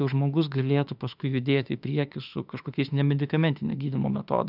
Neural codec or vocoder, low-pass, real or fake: autoencoder, 48 kHz, 32 numbers a frame, DAC-VAE, trained on Japanese speech; 5.4 kHz; fake